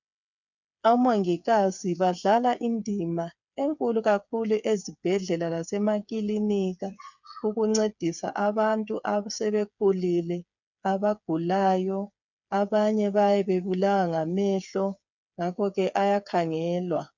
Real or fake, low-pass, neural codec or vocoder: fake; 7.2 kHz; codec, 16 kHz, 8 kbps, FreqCodec, smaller model